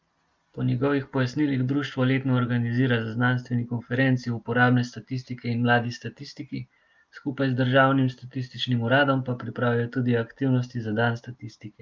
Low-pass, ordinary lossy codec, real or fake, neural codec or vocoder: 7.2 kHz; Opus, 32 kbps; real; none